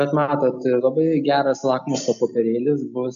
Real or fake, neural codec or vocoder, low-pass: real; none; 7.2 kHz